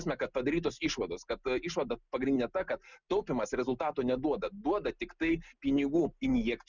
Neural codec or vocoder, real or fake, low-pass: none; real; 7.2 kHz